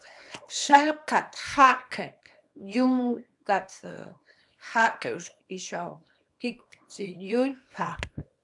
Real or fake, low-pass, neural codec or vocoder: fake; 10.8 kHz; codec, 24 kHz, 0.9 kbps, WavTokenizer, small release